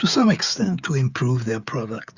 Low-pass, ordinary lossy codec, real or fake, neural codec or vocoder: 7.2 kHz; Opus, 64 kbps; fake; codec, 16 kHz, 16 kbps, FreqCodec, smaller model